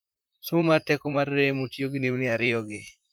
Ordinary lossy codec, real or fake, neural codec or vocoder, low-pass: none; fake; vocoder, 44.1 kHz, 128 mel bands, Pupu-Vocoder; none